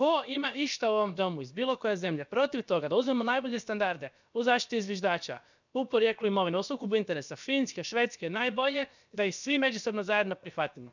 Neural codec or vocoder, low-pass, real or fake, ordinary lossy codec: codec, 16 kHz, about 1 kbps, DyCAST, with the encoder's durations; 7.2 kHz; fake; none